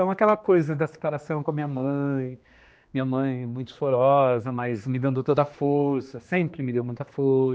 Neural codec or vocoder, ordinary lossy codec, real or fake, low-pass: codec, 16 kHz, 2 kbps, X-Codec, HuBERT features, trained on general audio; none; fake; none